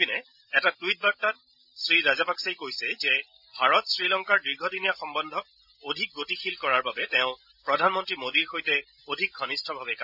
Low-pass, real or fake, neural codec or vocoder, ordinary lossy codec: 5.4 kHz; real; none; none